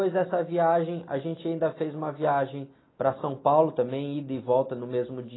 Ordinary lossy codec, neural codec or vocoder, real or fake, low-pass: AAC, 16 kbps; none; real; 7.2 kHz